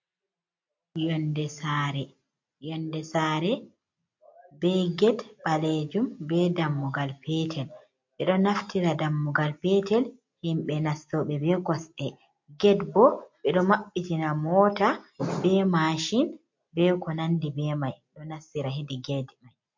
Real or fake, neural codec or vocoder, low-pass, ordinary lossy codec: real; none; 7.2 kHz; MP3, 48 kbps